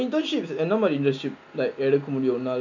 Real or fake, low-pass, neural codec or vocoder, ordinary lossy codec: real; 7.2 kHz; none; none